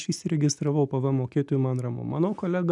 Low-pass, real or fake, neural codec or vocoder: 10.8 kHz; real; none